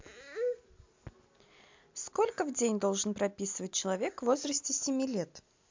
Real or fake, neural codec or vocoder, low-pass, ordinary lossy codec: real; none; 7.2 kHz; MP3, 64 kbps